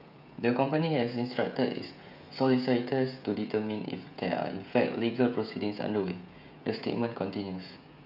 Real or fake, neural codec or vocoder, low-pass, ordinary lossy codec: fake; codec, 16 kHz, 16 kbps, FreqCodec, smaller model; 5.4 kHz; none